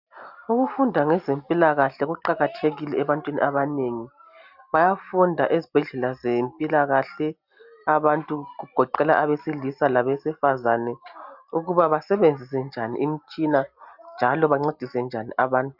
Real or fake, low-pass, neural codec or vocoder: real; 5.4 kHz; none